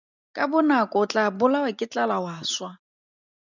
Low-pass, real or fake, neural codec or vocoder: 7.2 kHz; real; none